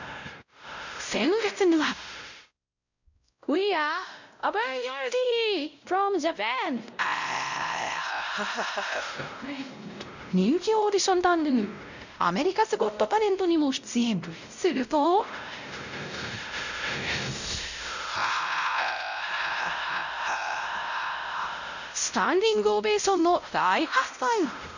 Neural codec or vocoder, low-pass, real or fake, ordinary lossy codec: codec, 16 kHz, 0.5 kbps, X-Codec, WavLM features, trained on Multilingual LibriSpeech; 7.2 kHz; fake; none